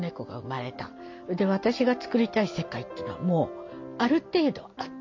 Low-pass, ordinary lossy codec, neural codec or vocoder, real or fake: 7.2 kHz; MP3, 48 kbps; none; real